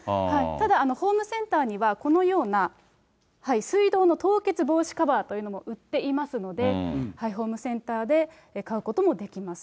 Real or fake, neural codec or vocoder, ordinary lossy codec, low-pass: real; none; none; none